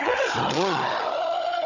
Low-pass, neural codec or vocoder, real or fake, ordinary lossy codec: 7.2 kHz; codec, 16 kHz, 16 kbps, FunCodec, trained on Chinese and English, 50 frames a second; fake; none